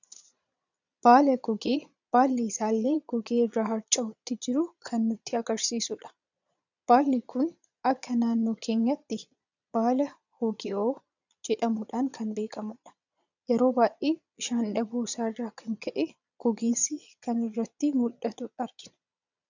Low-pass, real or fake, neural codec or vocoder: 7.2 kHz; fake; vocoder, 22.05 kHz, 80 mel bands, Vocos